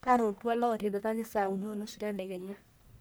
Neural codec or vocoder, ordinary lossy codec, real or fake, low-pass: codec, 44.1 kHz, 1.7 kbps, Pupu-Codec; none; fake; none